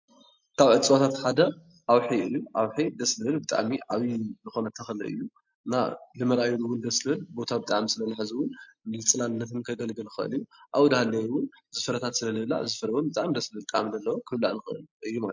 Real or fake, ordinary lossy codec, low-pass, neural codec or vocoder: real; MP3, 48 kbps; 7.2 kHz; none